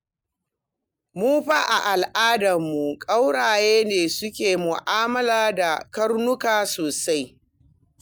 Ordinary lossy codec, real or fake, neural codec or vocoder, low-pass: none; real; none; none